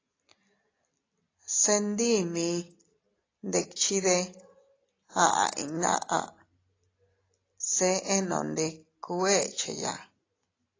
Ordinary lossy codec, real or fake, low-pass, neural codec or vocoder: AAC, 32 kbps; real; 7.2 kHz; none